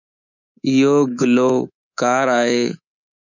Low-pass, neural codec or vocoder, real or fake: 7.2 kHz; codec, 24 kHz, 3.1 kbps, DualCodec; fake